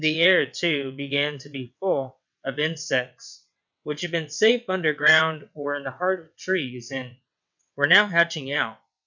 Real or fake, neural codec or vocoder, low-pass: fake; vocoder, 22.05 kHz, 80 mel bands, WaveNeXt; 7.2 kHz